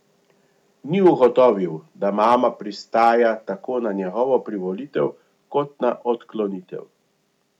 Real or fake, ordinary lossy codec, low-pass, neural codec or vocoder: real; none; 19.8 kHz; none